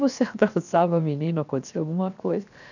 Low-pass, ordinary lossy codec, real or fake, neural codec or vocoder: 7.2 kHz; none; fake; codec, 16 kHz, 0.7 kbps, FocalCodec